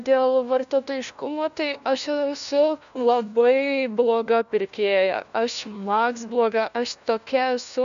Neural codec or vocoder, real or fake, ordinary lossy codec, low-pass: codec, 16 kHz, 1 kbps, FunCodec, trained on LibriTTS, 50 frames a second; fake; AAC, 96 kbps; 7.2 kHz